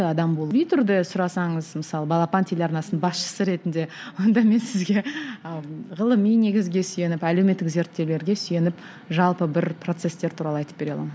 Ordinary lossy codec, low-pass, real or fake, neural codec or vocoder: none; none; real; none